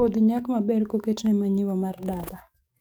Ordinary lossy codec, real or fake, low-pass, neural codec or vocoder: none; fake; none; codec, 44.1 kHz, 7.8 kbps, DAC